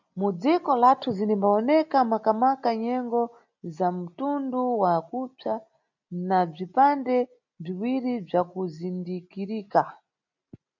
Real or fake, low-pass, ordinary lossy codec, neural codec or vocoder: real; 7.2 kHz; MP3, 64 kbps; none